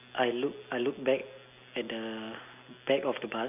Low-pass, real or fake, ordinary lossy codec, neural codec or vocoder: 3.6 kHz; real; none; none